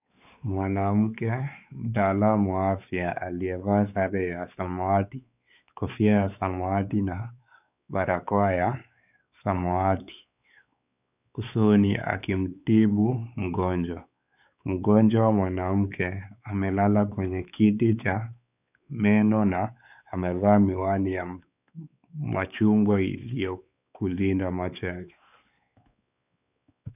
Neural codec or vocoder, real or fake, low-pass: codec, 16 kHz, 4 kbps, X-Codec, WavLM features, trained on Multilingual LibriSpeech; fake; 3.6 kHz